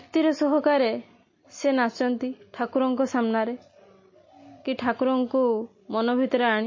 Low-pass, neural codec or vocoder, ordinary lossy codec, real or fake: 7.2 kHz; none; MP3, 32 kbps; real